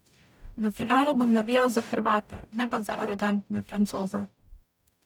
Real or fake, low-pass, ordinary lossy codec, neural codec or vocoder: fake; 19.8 kHz; none; codec, 44.1 kHz, 0.9 kbps, DAC